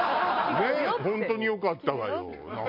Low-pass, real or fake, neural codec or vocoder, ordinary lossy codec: 5.4 kHz; real; none; MP3, 48 kbps